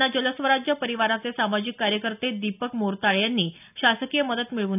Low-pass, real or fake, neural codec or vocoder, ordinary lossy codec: 3.6 kHz; real; none; none